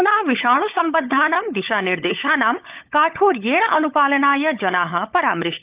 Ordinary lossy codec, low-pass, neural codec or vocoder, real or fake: Opus, 32 kbps; 3.6 kHz; codec, 16 kHz, 16 kbps, FunCodec, trained on LibriTTS, 50 frames a second; fake